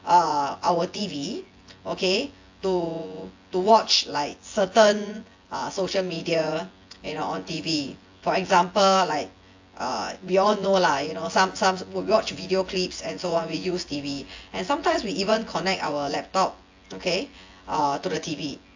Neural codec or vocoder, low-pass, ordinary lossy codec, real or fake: vocoder, 24 kHz, 100 mel bands, Vocos; 7.2 kHz; AAC, 48 kbps; fake